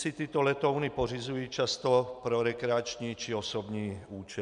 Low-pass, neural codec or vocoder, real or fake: 10.8 kHz; none; real